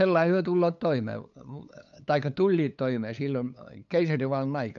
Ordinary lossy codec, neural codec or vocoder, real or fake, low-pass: none; codec, 16 kHz, 8 kbps, FunCodec, trained on LibriTTS, 25 frames a second; fake; 7.2 kHz